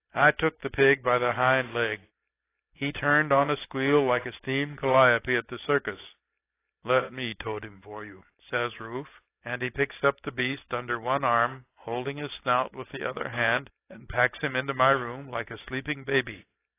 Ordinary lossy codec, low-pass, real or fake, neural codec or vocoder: AAC, 24 kbps; 3.6 kHz; real; none